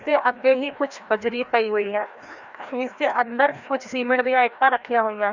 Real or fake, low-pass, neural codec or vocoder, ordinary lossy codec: fake; 7.2 kHz; codec, 16 kHz, 1 kbps, FreqCodec, larger model; none